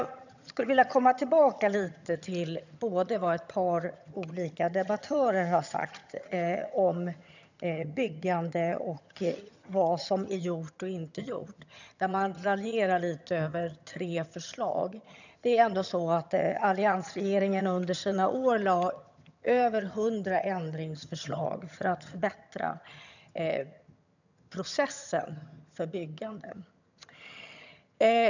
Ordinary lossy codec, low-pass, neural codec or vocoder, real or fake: none; 7.2 kHz; vocoder, 22.05 kHz, 80 mel bands, HiFi-GAN; fake